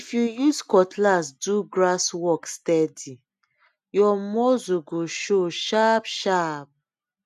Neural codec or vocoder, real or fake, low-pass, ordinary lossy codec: none; real; 14.4 kHz; none